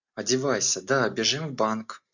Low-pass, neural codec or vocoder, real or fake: 7.2 kHz; none; real